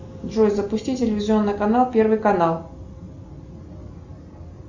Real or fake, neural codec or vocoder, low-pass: real; none; 7.2 kHz